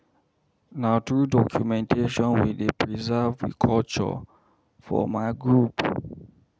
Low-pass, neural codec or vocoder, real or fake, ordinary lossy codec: none; none; real; none